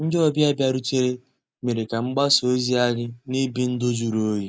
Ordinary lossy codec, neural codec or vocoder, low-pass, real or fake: none; none; none; real